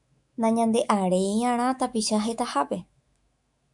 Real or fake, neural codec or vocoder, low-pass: fake; autoencoder, 48 kHz, 128 numbers a frame, DAC-VAE, trained on Japanese speech; 10.8 kHz